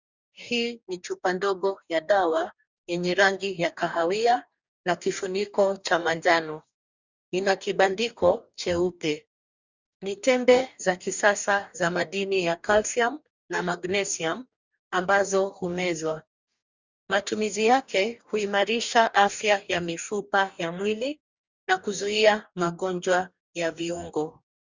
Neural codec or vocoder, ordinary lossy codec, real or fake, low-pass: codec, 44.1 kHz, 2.6 kbps, DAC; Opus, 64 kbps; fake; 7.2 kHz